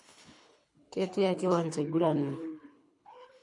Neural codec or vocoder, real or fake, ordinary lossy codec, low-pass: codec, 24 kHz, 3 kbps, HILCodec; fake; MP3, 48 kbps; 10.8 kHz